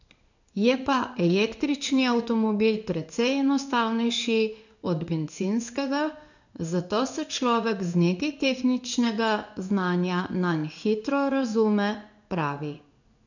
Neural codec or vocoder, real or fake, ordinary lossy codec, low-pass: codec, 16 kHz in and 24 kHz out, 1 kbps, XY-Tokenizer; fake; none; 7.2 kHz